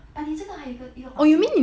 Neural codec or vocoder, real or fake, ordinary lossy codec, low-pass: none; real; none; none